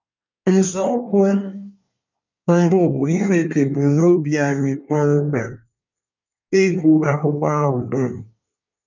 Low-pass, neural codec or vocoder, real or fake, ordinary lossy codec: 7.2 kHz; codec, 24 kHz, 1 kbps, SNAC; fake; none